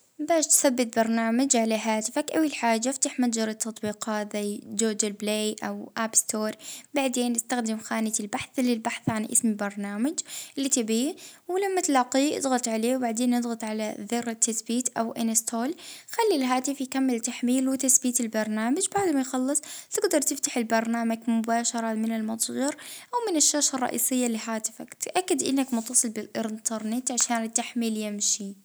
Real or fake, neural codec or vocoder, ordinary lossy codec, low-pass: real; none; none; none